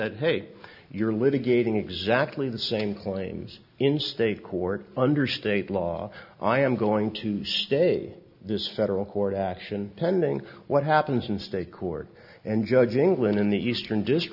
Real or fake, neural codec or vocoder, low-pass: real; none; 5.4 kHz